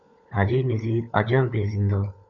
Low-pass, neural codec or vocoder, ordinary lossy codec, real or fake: 7.2 kHz; codec, 16 kHz, 16 kbps, FunCodec, trained on LibriTTS, 50 frames a second; MP3, 64 kbps; fake